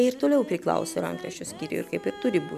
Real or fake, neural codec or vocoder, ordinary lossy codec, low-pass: real; none; MP3, 96 kbps; 14.4 kHz